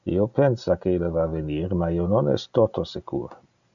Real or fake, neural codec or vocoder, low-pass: real; none; 7.2 kHz